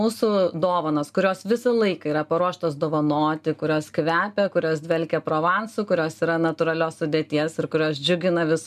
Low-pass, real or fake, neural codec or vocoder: 14.4 kHz; real; none